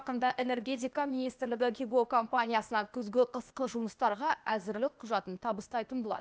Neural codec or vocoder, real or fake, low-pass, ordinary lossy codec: codec, 16 kHz, 0.8 kbps, ZipCodec; fake; none; none